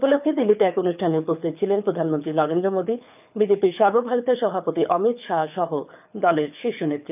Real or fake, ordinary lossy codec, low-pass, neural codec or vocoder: fake; none; 3.6 kHz; codec, 24 kHz, 6 kbps, HILCodec